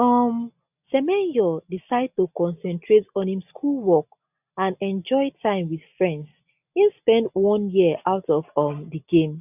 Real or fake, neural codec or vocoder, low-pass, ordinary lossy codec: real; none; 3.6 kHz; none